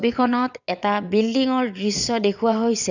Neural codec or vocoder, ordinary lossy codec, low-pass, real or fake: vocoder, 22.05 kHz, 80 mel bands, WaveNeXt; none; 7.2 kHz; fake